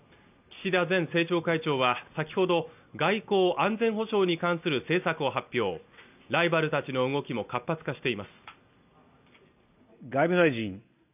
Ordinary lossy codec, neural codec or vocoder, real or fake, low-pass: none; none; real; 3.6 kHz